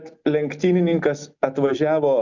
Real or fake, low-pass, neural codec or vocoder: real; 7.2 kHz; none